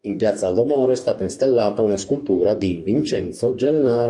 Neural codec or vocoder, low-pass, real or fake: codec, 44.1 kHz, 2.6 kbps, DAC; 10.8 kHz; fake